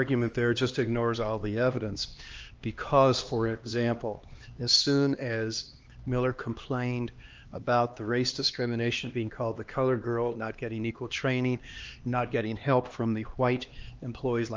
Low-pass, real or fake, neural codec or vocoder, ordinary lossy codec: 7.2 kHz; fake; codec, 16 kHz, 2 kbps, X-Codec, HuBERT features, trained on LibriSpeech; Opus, 24 kbps